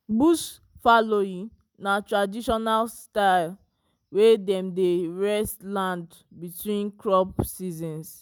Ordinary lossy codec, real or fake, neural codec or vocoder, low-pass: none; real; none; none